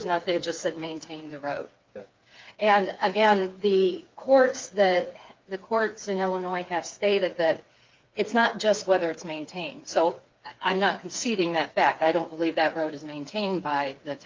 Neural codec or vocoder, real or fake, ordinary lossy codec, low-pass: codec, 16 kHz, 4 kbps, FreqCodec, smaller model; fake; Opus, 24 kbps; 7.2 kHz